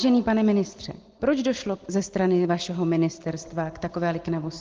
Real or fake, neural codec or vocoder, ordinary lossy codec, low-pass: real; none; Opus, 16 kbps; 7.2 kHz